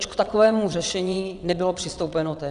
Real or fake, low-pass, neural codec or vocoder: fake; 9.9 kHz; vocoder, 22.05 kHz, 80 mel bands, WaveNeXt